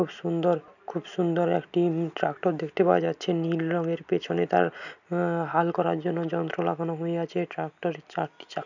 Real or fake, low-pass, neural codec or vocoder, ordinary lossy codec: real; 7.2 kHz; none; none